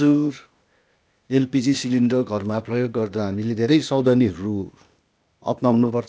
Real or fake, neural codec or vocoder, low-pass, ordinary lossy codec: fake; codec, 16 kHz, 0.8 kbps, ZipCodec; none; none